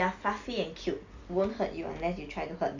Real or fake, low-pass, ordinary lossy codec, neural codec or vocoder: real; 7.2 kHz; Opus, 64 kbps; none